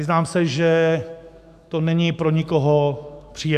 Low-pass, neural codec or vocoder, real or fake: 14.4 kHz; autoencoder, 48 kHz, 128 numbers a frame, DAC-VAE, trained on Japanese speech; fake